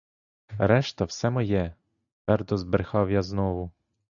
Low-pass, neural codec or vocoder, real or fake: 7.2 kHz; none; real